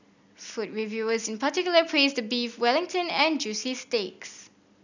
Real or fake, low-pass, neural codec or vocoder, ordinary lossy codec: real; 7.2 kHz; none; none